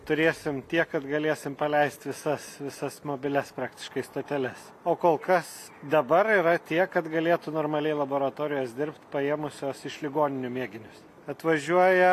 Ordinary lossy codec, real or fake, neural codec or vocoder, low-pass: AAC, 64 kbps; real; none; 14.4 kHz